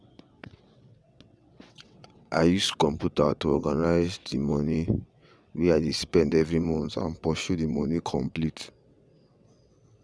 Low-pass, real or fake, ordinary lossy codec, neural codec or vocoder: none; fake; none; vocoder, 22.05 kHz, 80 mel bands, WaveNeXt